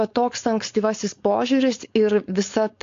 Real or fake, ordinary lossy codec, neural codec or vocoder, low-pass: fake; AAC, 48 kbps; codec, 16 kHz, 4.8 kbps, FACodec; 7.2 kHz